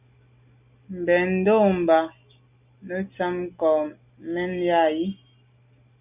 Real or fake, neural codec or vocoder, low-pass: real; none; 3.6 kHz